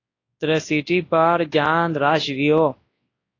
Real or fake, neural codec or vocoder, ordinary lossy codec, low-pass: fake; codec, 24 kHz, 0.9 kbps, WavTokenizer, large speech release; AAC, 32 kbps; 7.2 kHz